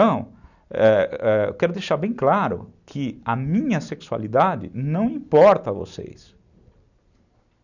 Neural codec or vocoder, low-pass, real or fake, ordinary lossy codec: none; 7.2 kHz; real; none